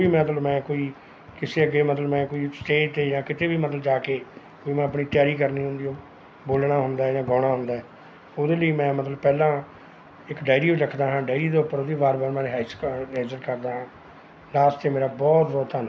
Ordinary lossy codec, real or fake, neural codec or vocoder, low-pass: none; real; none; none